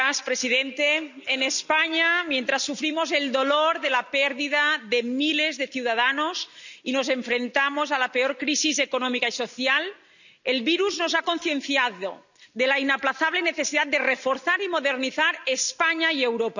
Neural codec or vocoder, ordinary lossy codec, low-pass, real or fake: none; none; 7.2 kHz; real